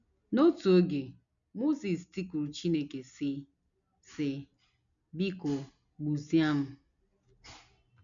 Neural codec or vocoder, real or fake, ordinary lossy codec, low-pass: none; real; none; 7.2 kHz